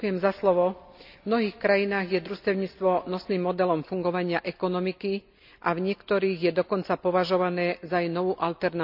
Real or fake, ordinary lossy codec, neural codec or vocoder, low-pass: real; none; none; 5.4 kHz